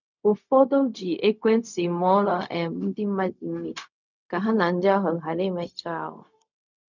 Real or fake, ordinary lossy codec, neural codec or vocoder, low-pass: fake; none; codec, 16 kHz, 0.4 kbps, LongCat-Audio-Codec; 7.2 kHz